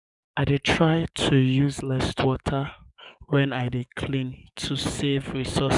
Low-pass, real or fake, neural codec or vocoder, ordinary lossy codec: 10.8 kHz; fake; codec, 44.1 kHz, 7.8 kbps, DAC; none